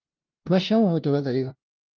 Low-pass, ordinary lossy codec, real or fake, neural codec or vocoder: 7.2 kHz; Opus, 32 kbps; fake; codec, 16 kHz, 0.5 kbps, FunCodec, trained on LibriTTS, 25 frames a second